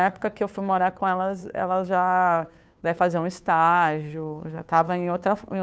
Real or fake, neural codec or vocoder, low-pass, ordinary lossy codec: fake; codec, 16 kHz, 2 kbps, FunCodec, trained on Chinese and English, 25 frames a second; none; none